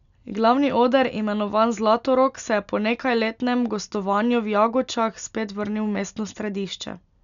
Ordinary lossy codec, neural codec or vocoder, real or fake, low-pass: none; none; real; 7.2 kHz